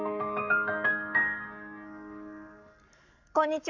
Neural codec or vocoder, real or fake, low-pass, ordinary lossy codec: codec, 44.1 kHz, 7.8 kbps, Pupu-Codec; fake; 7.2 kHz; none